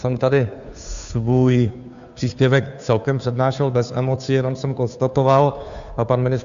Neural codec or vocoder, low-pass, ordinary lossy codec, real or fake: codec, 16 kHz, 2 kbps, FunCodec, trained on Chinese and English, 25 frames a second; 7.2 kHz; AAC, 96 kbps; fake